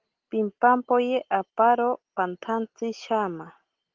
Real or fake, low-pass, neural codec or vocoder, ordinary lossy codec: real; 7.2 kHz; none; Opus, 16 kbps